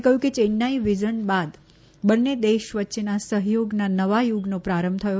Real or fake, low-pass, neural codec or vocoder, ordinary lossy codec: real; none; none; none